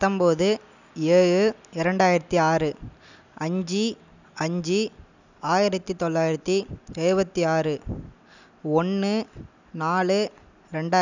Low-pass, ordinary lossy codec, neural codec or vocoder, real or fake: 7.2 kHz; none; none; real